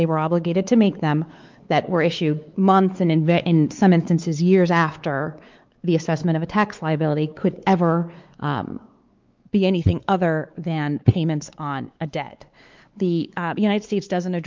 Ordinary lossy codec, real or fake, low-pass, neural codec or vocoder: Opus, 32 kbps; fake; 7.2 kHz; codec, 16 kHz, 4 kbps, X-Codec, WavLM features, trained on Multilingual LibriSpeech